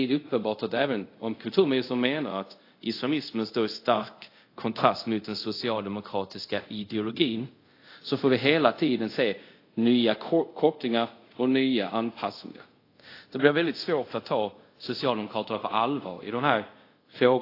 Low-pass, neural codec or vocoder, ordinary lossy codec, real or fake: 5.4 kHz; codec, 24 kHz, 0.5 kbps, DualCodec; AAC, 32 kbps; fake